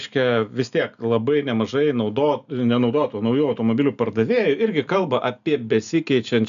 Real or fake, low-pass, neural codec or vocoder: real; 7.2 kHz; none